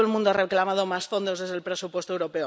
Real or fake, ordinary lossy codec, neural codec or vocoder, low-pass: real; none; none; none